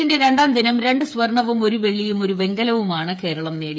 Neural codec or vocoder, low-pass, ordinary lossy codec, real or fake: codec, 16 kHz, 8 kbps, FreqCodec, smaller model; none; none; fake